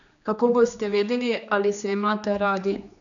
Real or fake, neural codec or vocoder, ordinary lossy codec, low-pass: fake; codec, 16 kHz, 2 kbps, X-Codec, HuBERT features, trained on general audio; none; 7.2 kHz